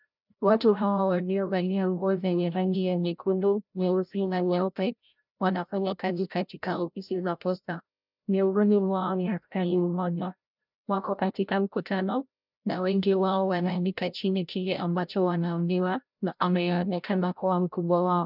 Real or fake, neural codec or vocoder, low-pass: fake; codec, 16 kHz, 0.5 kbps, FreqCodec, larger model; 5.4 kHz